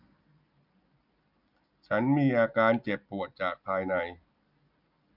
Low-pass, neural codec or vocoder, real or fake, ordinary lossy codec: 5.4 kHz; none; real; none